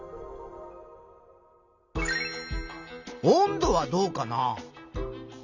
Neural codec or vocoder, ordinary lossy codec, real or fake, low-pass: none; none; real; 7.2 kHz